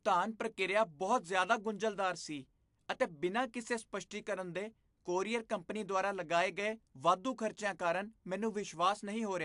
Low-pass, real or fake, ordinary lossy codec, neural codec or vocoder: 10.8 kHz; real; AAC, 64 kbps; none